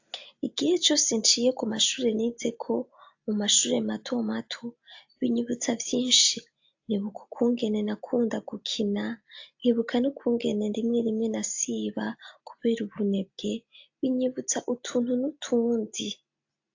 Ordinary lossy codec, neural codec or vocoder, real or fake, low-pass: AAC, 48 kbps; none; real; 7.2 kHz